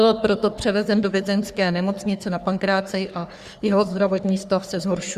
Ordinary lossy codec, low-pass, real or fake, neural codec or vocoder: Opus, 64 kbps; 14.4 kHz; fake; codec, 44.1 kHz, 3.4 kbps, Pupu-Codec